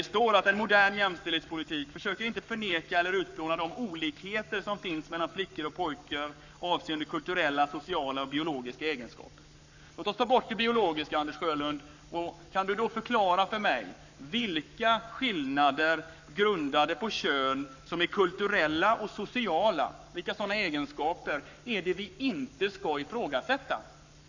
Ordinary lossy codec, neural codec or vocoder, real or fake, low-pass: none; codec, 44.1 kHz, 7.8 kbps, Pupu-Codec; fake; 7.2 kHz